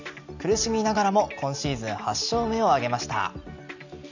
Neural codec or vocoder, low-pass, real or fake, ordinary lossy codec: none; 7.2 kHz; real; none